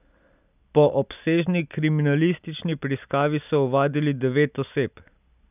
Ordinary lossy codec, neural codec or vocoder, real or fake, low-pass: none; none; real; 3.6 kHz